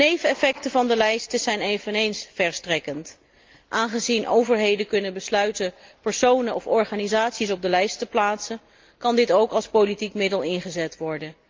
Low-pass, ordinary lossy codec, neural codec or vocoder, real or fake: 7.2 kHz; Opus, 32 kbps; none; real